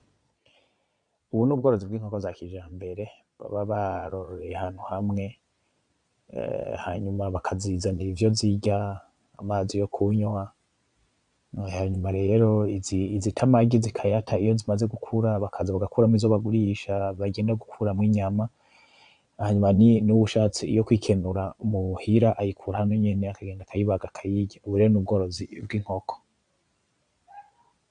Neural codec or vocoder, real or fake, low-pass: vocoder, 22.05 kHz, 80 mel bands, Vocos; fake; 9.9 kHz